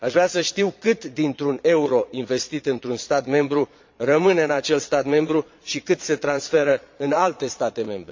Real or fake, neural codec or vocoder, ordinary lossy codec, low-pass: fake; vocoder, 22.05 kHz, 80 mel bands, Vocos; MP3, 48 kbps; 7.2 kHz